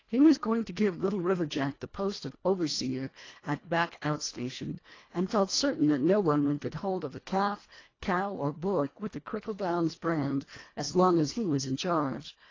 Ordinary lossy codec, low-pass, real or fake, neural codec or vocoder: AAC, 32 kbps; 7.2 kHz; fake; codec, 24 kHz, 1.5 kbps, HILCodec